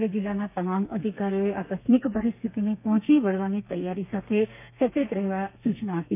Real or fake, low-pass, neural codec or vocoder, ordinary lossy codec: fake; 3.6 kHz; codec, 32 kHz, 1.9 kbps, SNAC; none